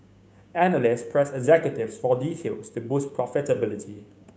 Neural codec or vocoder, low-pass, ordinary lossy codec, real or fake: codec, 16 kHz, 6 kbps, DAC; none; none; fake